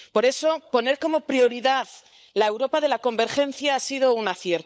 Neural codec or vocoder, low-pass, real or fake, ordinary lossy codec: codec, 16 kHz, 16 kbps, FunCodec, trained on LibriTTS, 50 frames a second; none; fake; none